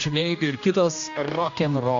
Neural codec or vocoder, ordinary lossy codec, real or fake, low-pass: codec, 16 kHz, 1 kbps, X-Codec, HuBERT features, trained on general audio; AAC, 48 kbps; fake; 7.2 kHz